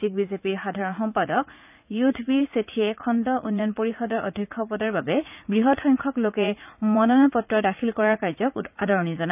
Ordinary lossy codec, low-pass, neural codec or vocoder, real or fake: none; 3.6 kHz; vocoder, 44.1 kHz, 80 mel bands, Vocos; fake